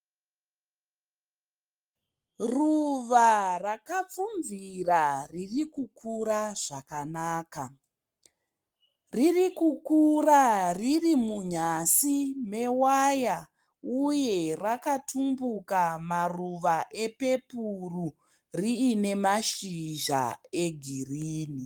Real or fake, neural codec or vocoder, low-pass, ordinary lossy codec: fake; codec, 44.1 kHz, 7.8 kbps, Pupu-Codec; 19.8 kHz; Opus, 32 kbps